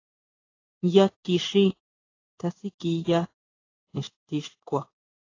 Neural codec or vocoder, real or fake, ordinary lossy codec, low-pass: codec, 16 kHz in and 24 kHz out, 1 kbps, XY-Tokenizer; fake; AAC, 32 kbps; 7.2 kHz